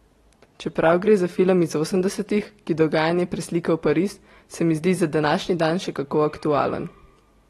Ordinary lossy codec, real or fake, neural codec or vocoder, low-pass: AAC, 32 kbps; real; none; 19.8 kHz